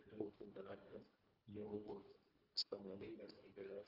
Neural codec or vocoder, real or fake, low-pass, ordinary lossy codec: codec, 24 kHz, 1.5 kbps, HILCodec; fake; 5.4 kHz; Opus, 32 kbps